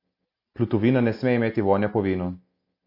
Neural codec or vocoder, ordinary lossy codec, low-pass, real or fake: none; MP3, 32 kbps; 5.4 kHz; real